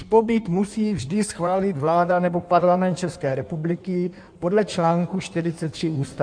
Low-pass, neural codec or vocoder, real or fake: 9.9 kHz; codec, 16 kHz in and 24 kHz out, 1.1 kbps, FireRedTTS-2 codec; fake